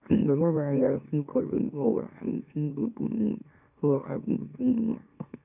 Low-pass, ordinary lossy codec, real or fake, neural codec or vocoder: 3.6 kHz; none; fake; autoencoder, 44.1 kHz, a latent of 192 numbers a frame, MeloTTS